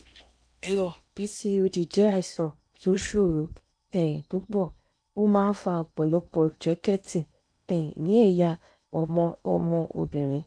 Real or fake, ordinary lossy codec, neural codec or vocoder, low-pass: fake; none; codec, 16 kHz in and 24 kHz out, 0.8 kbps, FocalCodec, streaming, 65536 codes; 9.9 kHz